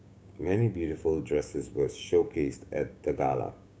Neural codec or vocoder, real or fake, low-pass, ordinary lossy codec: codec, 16 kHz, 6 kbps, DAC; fake; none; none